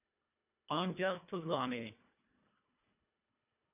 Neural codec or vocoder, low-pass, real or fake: codec, 24 kHz, 1.5 kbps, HILCodec; 3.6 kHz; fake